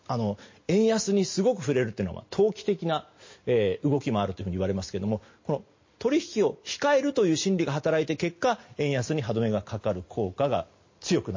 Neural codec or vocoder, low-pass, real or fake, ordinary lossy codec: none; 7.2 kHz; real; MP3, 32 kbps